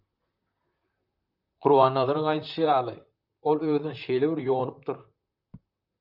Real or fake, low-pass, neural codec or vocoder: fake; 5.4 kHz; vocoder, 44.1 kHz, 128 mel bands, Pupu-Vocoder